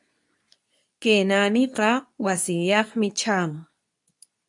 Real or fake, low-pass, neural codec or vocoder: fake; 10.8 kHz; codec, 24 kHz, 0.9 kbps, WavTokenizer, medium speech release version 2